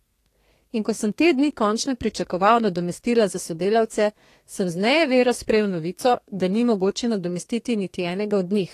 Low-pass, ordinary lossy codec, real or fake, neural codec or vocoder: 14.4 kHz; AAC, 48 kbps; fake; codec, 32 kHz, 1.9 kbps, SNAC